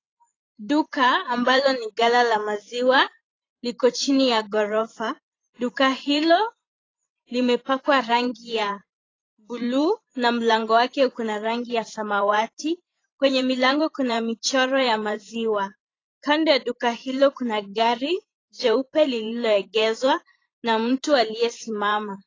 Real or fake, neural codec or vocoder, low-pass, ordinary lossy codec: fake; vocoder, 44.1 kHz, 128 mel bands every 512 samples, BigVGAN v2; 7.2 kHz; AAC, 32 kbps